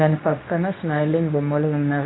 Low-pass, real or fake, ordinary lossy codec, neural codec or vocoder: 7.2 kHz; fake; AAC, 16 kbps; codec, 24 kHz, 0.9 kbps, WavTokenizer, medium speech release version 2